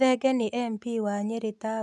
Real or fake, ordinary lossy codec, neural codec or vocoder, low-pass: real; none; none; none